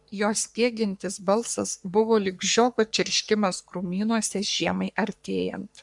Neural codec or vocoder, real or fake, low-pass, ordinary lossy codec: codec, 44.1 kHz, 3.4 kbps, Pupu-Codec; fake; 10.8 kHz; MP3, 96 kbps